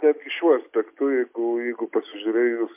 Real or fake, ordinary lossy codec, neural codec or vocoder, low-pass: real; MP3, 24 kbps; none; 3.6 kHz